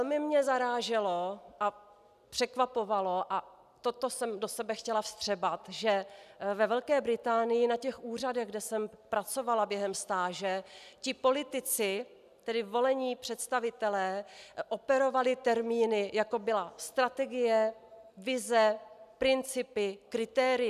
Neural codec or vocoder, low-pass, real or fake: none; 14.4 kHz; real